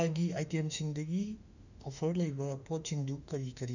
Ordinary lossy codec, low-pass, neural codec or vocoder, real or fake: none; 7.2 kHz; autoencoder, 48 kHz, 32 numbers a frame, DAC-VAE, trained on Japanese speech; fake